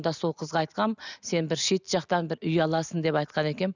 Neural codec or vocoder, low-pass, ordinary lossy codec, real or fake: none; 7.2 kHz; none; real